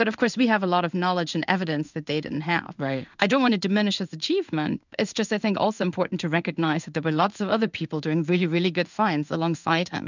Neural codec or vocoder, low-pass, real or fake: codec, 16 kHz in and 24 kHz out, 1 kbps, XY-Tokenizer; 7.2 kHz; fake